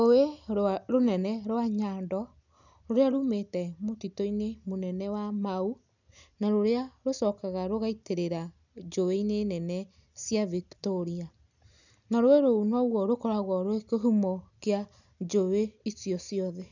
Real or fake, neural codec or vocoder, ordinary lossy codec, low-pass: real; none; none; 7.2 kHz